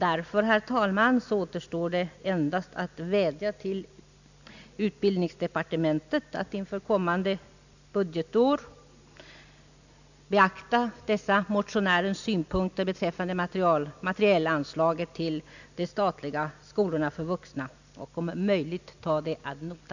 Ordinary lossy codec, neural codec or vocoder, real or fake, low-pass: none; none; real; 7.2 kHz